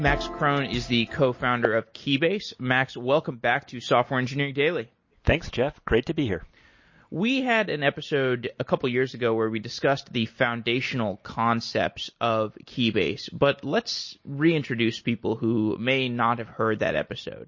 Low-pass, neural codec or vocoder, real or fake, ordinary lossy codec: 7.2 kHz; none; real; MP3, 32 kbps